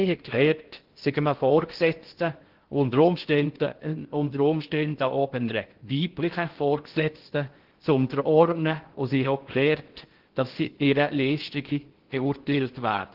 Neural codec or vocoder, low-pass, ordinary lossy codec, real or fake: codec, 16 kHz in and 24 kHz out, 0.6 kbps, FocalCodec, streaming, 2048 codes; 5.4 kHz; Opus, 16 kbps; fake